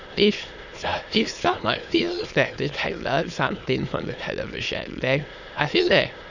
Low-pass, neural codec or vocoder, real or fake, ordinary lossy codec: 7.2 kHz; autoencoder, 22.05 kHz, a latent of 192 numbers a frame, VITS, trained on many speakers; fake; none